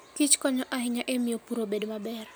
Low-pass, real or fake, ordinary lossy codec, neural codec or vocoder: none; real; none; none